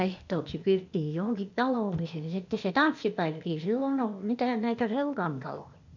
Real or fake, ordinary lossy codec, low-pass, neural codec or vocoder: fake; none; 7.2 kHz; codec, 16 kHz, 0.8 kbps, ZipCodec